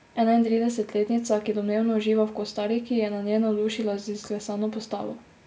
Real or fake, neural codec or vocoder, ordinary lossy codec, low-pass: real; none; none; none